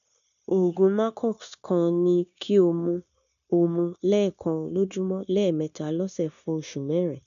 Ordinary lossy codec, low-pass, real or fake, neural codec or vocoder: none; 7.2 kHz; fake; codec, 16 kHz, 0.9 kbps, LongCat-Audio-Codec